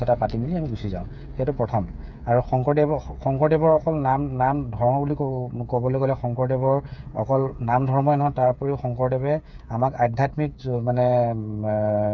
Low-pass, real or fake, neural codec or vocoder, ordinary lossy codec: 7.2 kHz; fake; codec, 16 kHz, 8 kbps, FreqCodec, smaller model; none